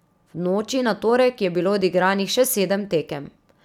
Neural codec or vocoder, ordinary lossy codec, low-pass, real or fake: none; none; 19.8 kHz; real